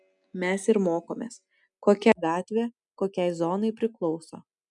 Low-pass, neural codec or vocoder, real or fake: 10.8 kHz; none; real